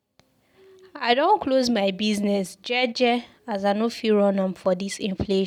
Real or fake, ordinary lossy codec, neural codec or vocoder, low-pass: real; none; none; 19.8 kHz